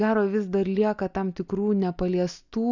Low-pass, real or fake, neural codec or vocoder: 7.2 kHz; real; none